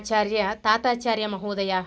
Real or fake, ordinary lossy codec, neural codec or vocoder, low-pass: real; none; none; none